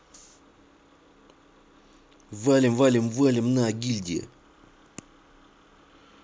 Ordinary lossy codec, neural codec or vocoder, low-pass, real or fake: none; none; none; real